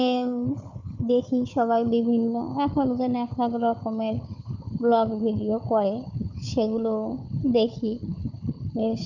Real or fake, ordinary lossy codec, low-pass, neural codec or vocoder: fake; none; 7.2 kHz; codec, 16 kHz, 4 kbps, FunCodec, trained on Chinese and English, 50 frames a second